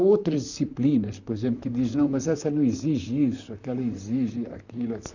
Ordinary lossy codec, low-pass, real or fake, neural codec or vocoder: none; 7.2 kHz; fake; vocoder, 44.1 kHz, 128 mel bands, Pupu-Vocoder